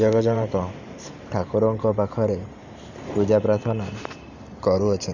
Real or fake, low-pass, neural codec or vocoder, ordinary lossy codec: fake; 7.2 kHz; vocoder, 44.1 kHz, 128 mel bands every 512 samples, BigVGAN v2; none